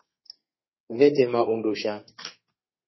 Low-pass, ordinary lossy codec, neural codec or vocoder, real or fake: 7.2 kHz; MP3, 24 kbps; codec, 32 kHz, 1.9 kbps, SNAC; fake